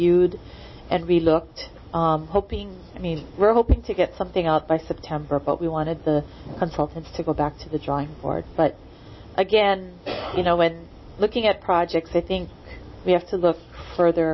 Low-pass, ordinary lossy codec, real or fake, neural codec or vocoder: 7.2 kHz; MP3, 24 kbps; real; none